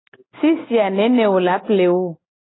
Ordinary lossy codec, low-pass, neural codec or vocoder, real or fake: AAC, 16 kbps; 7.2 kHz; none; real